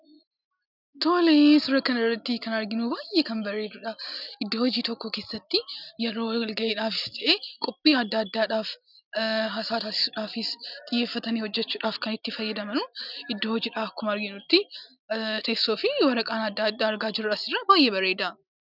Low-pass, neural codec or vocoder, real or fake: 5.4 kHz; none; real